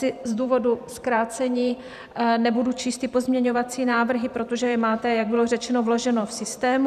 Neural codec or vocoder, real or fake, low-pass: vocoder, 44.1 kHz, 128 mel bands every 512 samples, BigVGAN v2; fake; 14.4 kHz